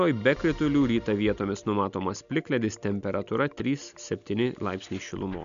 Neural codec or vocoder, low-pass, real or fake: none; 7.2 kHz; real